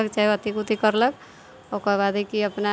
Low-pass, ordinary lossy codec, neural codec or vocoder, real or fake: none; none; none; real